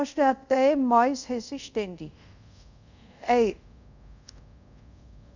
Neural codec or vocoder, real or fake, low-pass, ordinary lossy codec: codec, 24 kHz, 0.5 kbps, DualCodec; fake; 7.2 kHz; none